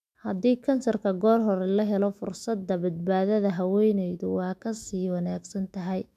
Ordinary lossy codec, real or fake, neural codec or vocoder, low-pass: none; fake; autoencoder, 48 kHz, 128 numbers a frame, DAC-VAE, trained on Japanese speech; 14.4 kHz